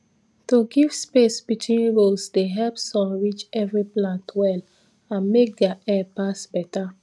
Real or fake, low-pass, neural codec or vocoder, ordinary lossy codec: real; none; none; none